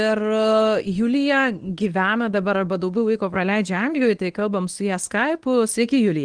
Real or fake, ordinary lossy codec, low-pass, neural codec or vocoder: fake; Opus, 24 kbps; 9.9 kHz; codec, 24 kHz, 0.9 kbps, WavTokenizer, medium speech release version 1